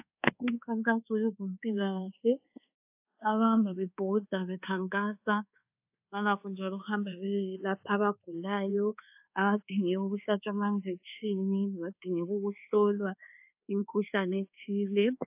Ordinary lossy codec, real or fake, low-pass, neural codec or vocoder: AAC, 32 kbps; fake; 3.6 kHz; codec, 24 kHz, 1.2 kbps, DualCodec